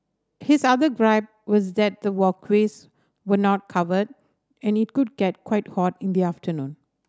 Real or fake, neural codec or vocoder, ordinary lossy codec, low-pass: real; none; none; none